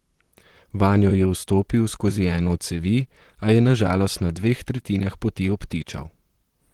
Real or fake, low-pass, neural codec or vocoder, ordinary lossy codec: fake; 19.8 kHz; vocoder, 44.1 kHz, 128 mel bands, Pupu-Vocoder; Opus, 16 kbps